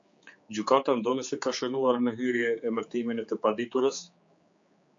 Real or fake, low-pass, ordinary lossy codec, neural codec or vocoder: fake; 7.2 kHz; MP3, 48 kbps; codec, 16 kHz, 4 kbps, X-Codec, HuBERT features, trained on general audio